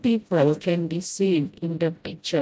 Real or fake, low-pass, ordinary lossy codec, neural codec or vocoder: fake; none; none; codec, 16 kHz, 0.5 kbps, FreqCodec, smaller model